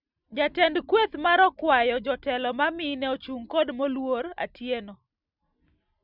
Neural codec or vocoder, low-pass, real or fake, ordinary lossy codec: none; 5.4 kHz; real; none